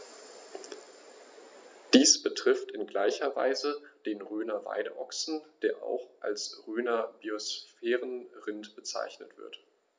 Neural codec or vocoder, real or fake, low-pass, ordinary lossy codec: none; real; none; none